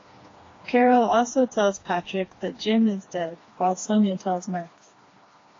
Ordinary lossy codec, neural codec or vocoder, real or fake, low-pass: AAC, 32 kbps; codec, 16 kHz, 2 kbps, FreqCodec, smaller model; fake; 7.2 kHz